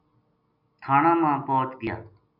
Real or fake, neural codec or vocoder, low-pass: real; none; 5.4 kHz